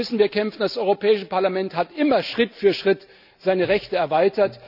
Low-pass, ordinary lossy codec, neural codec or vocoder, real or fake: 5.4 kHz; none; none; real